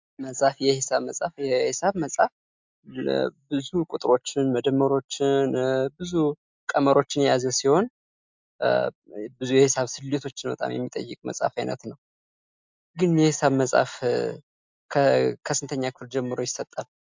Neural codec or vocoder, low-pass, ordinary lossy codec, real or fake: none; 7.2 kHz; MP3, 64 kbps; real